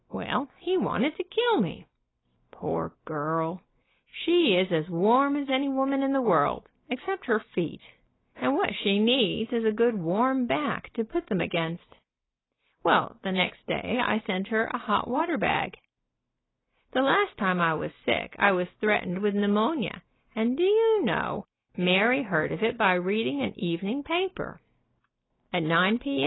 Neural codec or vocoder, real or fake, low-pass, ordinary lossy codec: none; real; 7.2 kHz; AAC, 16 kbps